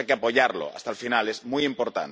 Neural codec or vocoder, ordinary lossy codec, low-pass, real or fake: none; none; none; real